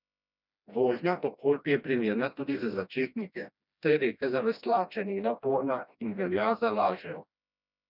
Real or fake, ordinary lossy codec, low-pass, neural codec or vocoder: fake; none; 5.4 kHz; codec, 16 kHz, 1 kbps, FreqCodec, smaller model